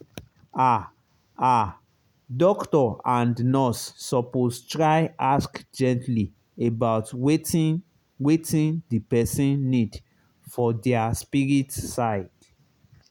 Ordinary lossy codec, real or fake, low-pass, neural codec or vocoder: none; real; 19.8 kHz; none